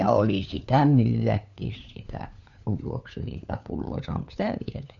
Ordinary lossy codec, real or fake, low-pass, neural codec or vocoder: none; fake; 7.2 kHz; codec, 16 kHz, 4 kbps, FunCodec, trained on LibriTTS, 50 frames a second